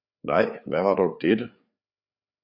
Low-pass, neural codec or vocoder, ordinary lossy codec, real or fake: 5.4 kHz; codec, 16 kHz, 4 kbps, FreqCodec, larger model; Opus, 64 kbps; fake